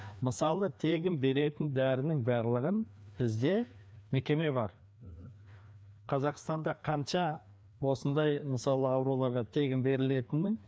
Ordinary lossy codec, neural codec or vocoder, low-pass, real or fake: none; codec, 16 kHz, 2 kbps, FreqCodec, larger model; none; fake